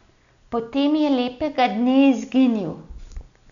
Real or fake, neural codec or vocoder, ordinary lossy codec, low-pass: real; none; none; 7.2 kHz